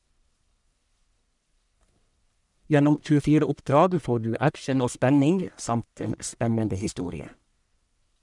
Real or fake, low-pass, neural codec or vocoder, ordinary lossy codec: fake; 10.8 kHz; codec, 44.1 kHz, 1.7 kbps, Pupu-Codec; none